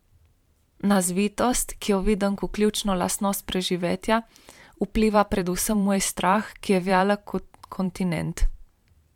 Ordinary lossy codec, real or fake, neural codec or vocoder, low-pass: MP3, 96 kbps; fake; vocoder, 44.1 kHz, 128 mel bands every 512 samples, BigVGAN v2; 19.8 kHz